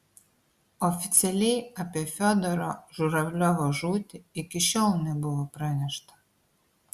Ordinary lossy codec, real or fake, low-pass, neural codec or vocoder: Opus, 64 kbps; real; 14.4 kHz; none